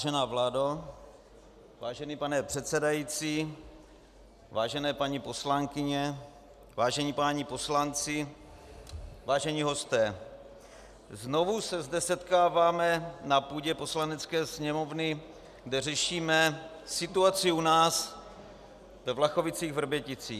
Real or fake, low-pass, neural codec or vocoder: real; 14.4 kHz; none